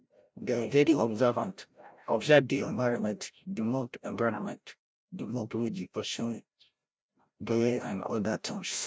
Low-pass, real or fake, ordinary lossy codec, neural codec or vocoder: none; fake; none; codec, 16 kHz, 0.5 kbps, FreqCodec, larger model